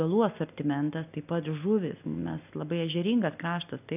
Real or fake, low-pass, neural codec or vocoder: real; 3.6 kHz; none